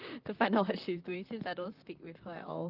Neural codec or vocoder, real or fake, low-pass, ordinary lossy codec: vocoder, 44.1 kHz, 128 mel bands, Pupu-Vocoder; fake; 5.4 kHz; Opus, 32 kbps